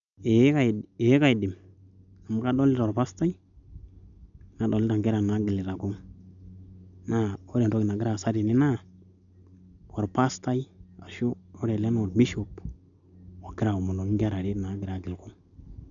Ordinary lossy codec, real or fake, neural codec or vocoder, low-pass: none; real; none; 7.2 kHz